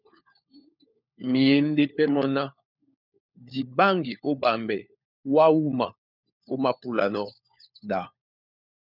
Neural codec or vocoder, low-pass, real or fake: codec, 16 kHz, 4 kbps, FunCodec, trained on LibriTTS, 50 frames a second; 5.4 kHz; fake